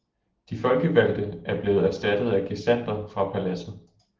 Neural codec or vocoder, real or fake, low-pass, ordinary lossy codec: none; real; 7.2 kHz; Opus, 16 kbps